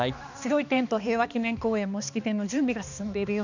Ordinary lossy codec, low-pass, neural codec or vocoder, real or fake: none; 7.2 kHz; codec, 16 kHz, 2 kbps, X-Codec, HuBERT features, trained on balanced general audio; fake